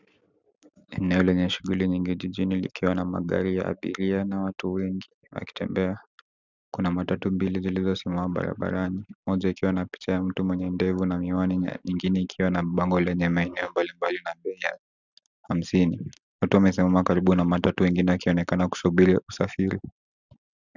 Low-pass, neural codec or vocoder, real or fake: 7.2 kHz; none; real